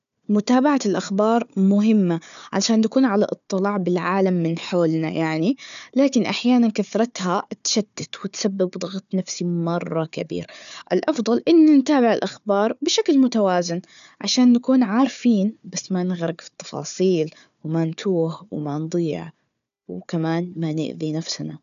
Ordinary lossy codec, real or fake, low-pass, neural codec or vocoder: MP3, 96 kbps; fake; 7.2 kHz; codec, 16 kHz, 4 kbps, FunCodec, trained on Chinese and English, 50 frames a second